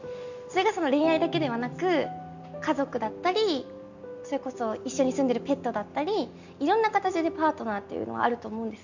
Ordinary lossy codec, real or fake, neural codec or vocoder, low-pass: none; real; none; 7.2 kHz